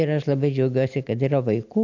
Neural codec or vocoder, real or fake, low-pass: none; real; 7.2 kHz